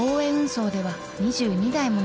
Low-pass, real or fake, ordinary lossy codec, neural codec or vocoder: none; real; none; none